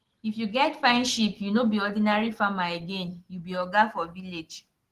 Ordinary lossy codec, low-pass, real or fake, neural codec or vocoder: Opus, 16 kbps; 14.4 kHz; real; none